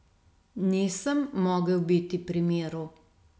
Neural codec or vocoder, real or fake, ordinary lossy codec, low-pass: none; real; none; none